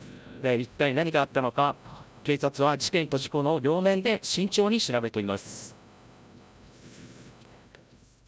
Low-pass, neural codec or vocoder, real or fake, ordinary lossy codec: none; codec, 16 kHz, 0.5 kbps, FreqCodec, larger model; fake; none